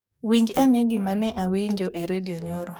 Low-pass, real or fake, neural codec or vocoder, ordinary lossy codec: none; fake; codec, 44.1 kHz, 2.6 kbps, DAC; none